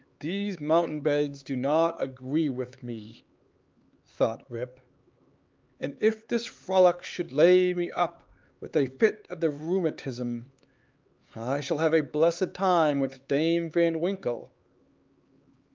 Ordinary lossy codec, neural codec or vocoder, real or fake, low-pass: Opus, 24 kbps; codec, 16 kHz, 4 kbps, X-Codec, HuBERT features, trained on LibriSpeech; fake; 7.2 kHz